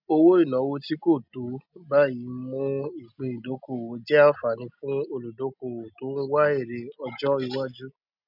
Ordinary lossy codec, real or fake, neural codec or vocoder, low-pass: none; real; none; 5.4 kHz